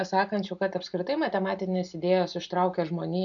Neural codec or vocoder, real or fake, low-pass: none; real; 7.2 kHz